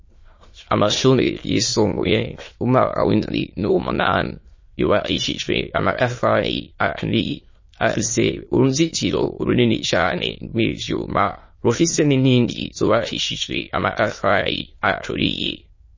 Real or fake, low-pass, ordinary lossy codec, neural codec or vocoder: fake; 7.2 kHz; MP3, 32 kbps; autoencoder, 22.05 kHz, a latent of 192 numbers a frame, VITS, trained on many speakers